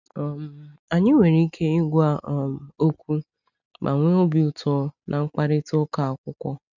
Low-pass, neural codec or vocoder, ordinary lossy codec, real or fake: 7.2 kHz; none; none; real